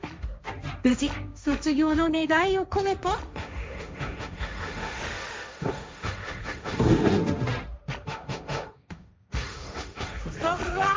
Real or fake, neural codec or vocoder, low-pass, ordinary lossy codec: fake; codec, 16 kHz, 1.1 kbps, Voila-Tokenizer; none; none